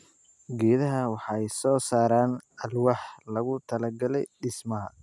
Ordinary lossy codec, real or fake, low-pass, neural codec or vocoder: none; real; none; none